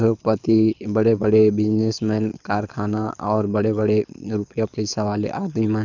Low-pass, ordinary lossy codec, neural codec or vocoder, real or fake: 7.2 kHz; none; codec, 24 kHz, 6 kbps, HILCodec; fake